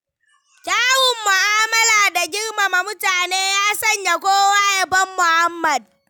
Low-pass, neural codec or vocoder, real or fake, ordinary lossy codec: none; none; real; none